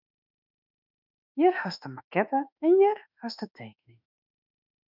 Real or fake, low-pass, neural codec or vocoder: fake; 5.4 kHz; autoencoder, 48 kHz, 32 numbers a frame, DAC-VAE, trained on Japanese speech